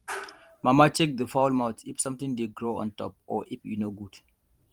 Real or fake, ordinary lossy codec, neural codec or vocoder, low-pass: fake; Opus, 24 kbps; vocoder, 44.1 kHz, 128 mel bands every 512 samples, BigVGAN v2; 19.8 kHz